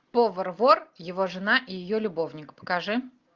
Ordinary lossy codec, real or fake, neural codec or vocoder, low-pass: Opus, 32 kbps; real; none; 7.2 kHz